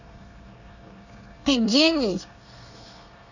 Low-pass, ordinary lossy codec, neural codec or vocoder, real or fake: 7.2 kHz; none; codec, 24 kHz, 1 kbps, SNAC; fake